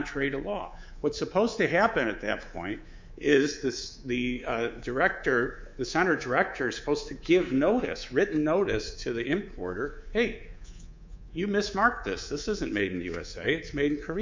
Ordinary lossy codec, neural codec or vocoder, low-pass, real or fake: MP3, 64 kbps; codec, 24 kHz, 3.1 kbps, DualCodec; 7.2 kHz; fake